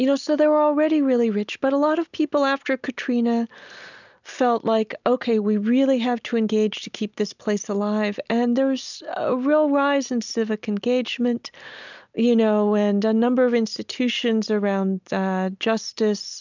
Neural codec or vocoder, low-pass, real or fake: none; 7.2 kHz; real